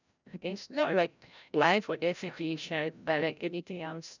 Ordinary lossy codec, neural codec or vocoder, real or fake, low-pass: none; codec, 16 kHz, 0.5 kbps, FreqCodec, larger model; fake; 7.2 kHz